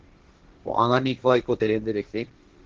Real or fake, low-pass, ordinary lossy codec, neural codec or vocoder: fake; 7.2 kHz; Opus, 16 kbps; codec, 16 kHz, 1.1 kbps, Voila-Tokenizer